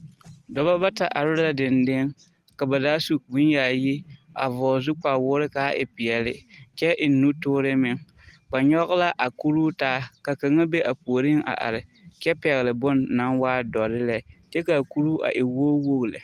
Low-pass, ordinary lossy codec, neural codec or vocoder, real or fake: 14.4 kHz; Opus, 24 kbps; none; real